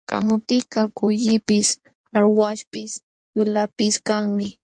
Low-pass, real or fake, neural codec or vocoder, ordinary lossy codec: 9.9 kHz; fake; codec, 16 kHz in and 24 kHz out, 1.1 kbps, FireRedTTS-2 codec; MP3, 64 kbps